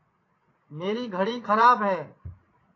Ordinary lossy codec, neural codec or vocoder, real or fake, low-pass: AAC, 32 kbps; vocoder, 44.1 kHz, 80 mel bands, Vocos; fake; 7.2 kHz